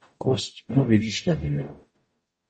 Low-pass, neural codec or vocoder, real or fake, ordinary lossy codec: 10.8 kHz; codec, 44.1 kHz, 0.9 kbps, DAC; fake; MP3, 32 kbps